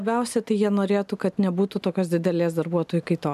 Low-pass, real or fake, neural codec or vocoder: 14.4 kHz; real; none